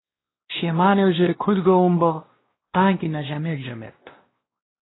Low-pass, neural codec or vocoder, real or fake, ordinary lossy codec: 7.2 kHz; codec, 16 kHz, 1 kbps, X-Codec, WavLM features, trained on Multilingual LibriSpeech; fake; AAC, 16 kbps